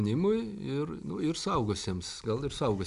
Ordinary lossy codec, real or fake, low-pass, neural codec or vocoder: MP3, 96 kbps; real; 10.8 kHz; none